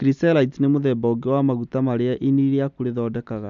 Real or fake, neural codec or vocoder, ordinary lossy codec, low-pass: real; none; none; 7.2 kHz